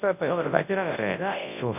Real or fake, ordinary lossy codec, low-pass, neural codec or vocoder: fake; none; 3.6 kHz; codec, 24 kHz, 0.9 kbps, WavTokenizer, large speech release